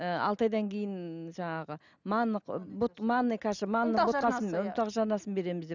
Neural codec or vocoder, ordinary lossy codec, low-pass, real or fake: none; none; 7.2 kHz; real